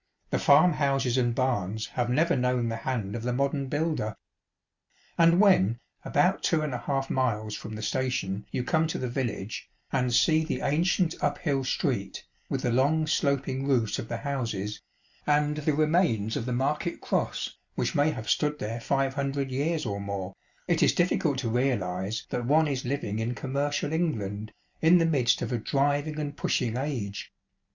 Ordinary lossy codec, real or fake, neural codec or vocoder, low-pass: Opus, 64 kbps; real; none; 7.2 kHz